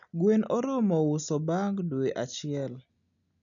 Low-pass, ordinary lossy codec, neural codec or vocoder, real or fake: 7.2 kHz; none; none; real